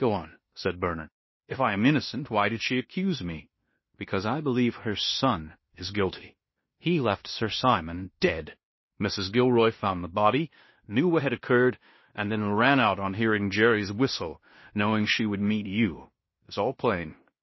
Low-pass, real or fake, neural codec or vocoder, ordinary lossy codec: 7.2 kHz; fake; codec, 16 kHz in and 24 kHz out, 0.9 kbps, LongCat-Audio-Codec, fine tuned four codebook decoder; MP3, 24 kbps